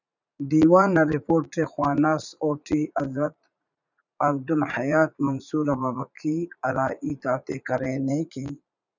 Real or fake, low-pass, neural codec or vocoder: fake; 7.2 kHz; vocoder, 44.1 kHz, 80 mel bands, Vocos